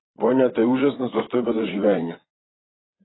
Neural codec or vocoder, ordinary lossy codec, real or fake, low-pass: vocoder, 22.05 kHz, 80 mel bands, Vocos; AAC, 16 kbps; fake; 7.2 kHz